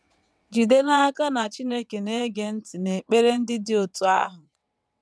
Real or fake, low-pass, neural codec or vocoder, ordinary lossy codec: fake; none; vocoder, 22.05 kHz, 80 mel bands, WaveNeXt; none